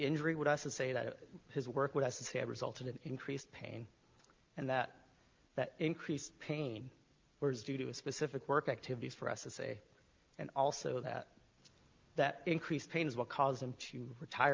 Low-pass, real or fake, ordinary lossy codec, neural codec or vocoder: 7.2 kHz; real; Opus, 24 kbps; none